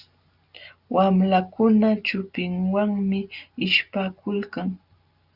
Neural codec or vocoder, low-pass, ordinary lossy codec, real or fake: none; 5.4 kHz; Opus, 64 kbps; real